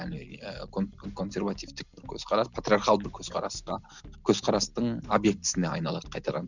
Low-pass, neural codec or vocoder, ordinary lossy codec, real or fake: 7.2 kHz; none; none; real